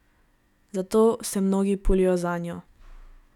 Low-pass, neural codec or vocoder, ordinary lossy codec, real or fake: 19.8 kHz; autoencoder, 48 kHz, 128 numbers a frame, DAC-VAE, trained on Japanese speech; none; fake